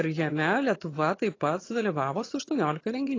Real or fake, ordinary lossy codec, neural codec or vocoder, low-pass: fake; AAC, 32 kbps; vocoder, 22.05 kHz, 80 mel bands, HiFi-GAN; 7.2 kHz